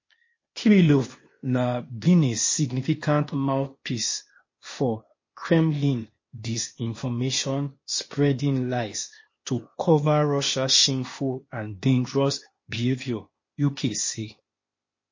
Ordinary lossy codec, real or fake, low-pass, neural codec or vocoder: MP3, 32 kbps; fake; 7.2 kHz; codec, 16 kHz, 0.8 kbps, ZipCodec